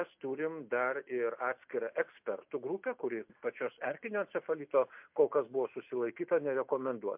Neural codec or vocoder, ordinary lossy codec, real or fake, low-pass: none; MP3, 32 kbps; real; 3.6 kHz